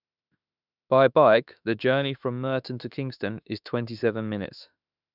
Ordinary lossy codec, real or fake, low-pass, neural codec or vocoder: none; fake; 5.4 kHz; autoencoder, 48 kHz, 32 numbers a frame, DAC-VAE, trained on Japanese speech